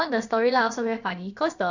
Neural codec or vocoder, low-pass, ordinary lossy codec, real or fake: codec, 16 kHz, about 1 kbps, DyCAST, with the encoder's durations; 7.2 kHz; none; fake